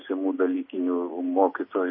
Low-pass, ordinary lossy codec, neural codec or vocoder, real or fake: 7.2 kHz; MP3, 24 kbps; none; real